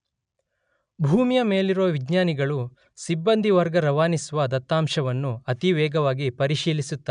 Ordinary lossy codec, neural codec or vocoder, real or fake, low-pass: MP3, 96 kbps; none; real; 9.9 kHz